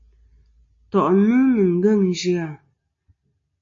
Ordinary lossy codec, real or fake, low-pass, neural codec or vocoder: MP3, 48 kbps; real; 7.2 kHz; none